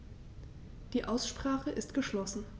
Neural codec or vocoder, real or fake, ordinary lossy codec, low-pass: none; real; none; none